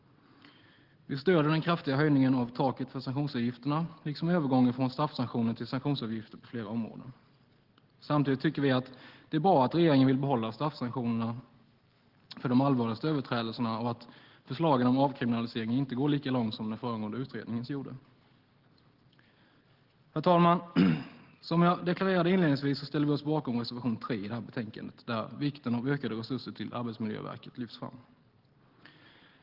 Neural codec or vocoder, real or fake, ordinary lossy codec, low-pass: none; real; Opus, 16 kbps; 5.4 kHz